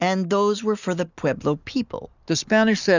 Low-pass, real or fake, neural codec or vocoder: 7.2 kHz; real; none